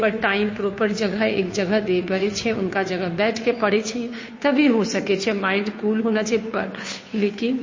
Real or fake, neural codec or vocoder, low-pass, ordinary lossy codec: fake; codec, 16 kHz, 2 kbps, FunCodec, trained on Chinese and English, 25 frames a second; 7.2 kHz; MP3, 32 kbps